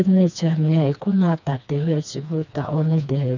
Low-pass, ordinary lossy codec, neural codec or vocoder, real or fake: 7.2 kHz; none; codec, 16 kHz, 2 kbps, FreqCodec, smaller model; fake